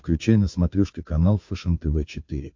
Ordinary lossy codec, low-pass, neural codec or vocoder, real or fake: MP3, 48 kbps; 7.2 kHz; codec, 16 kHz, 2 kbps, FunCodec, trained on Chinese and English, 25 frames a second; fake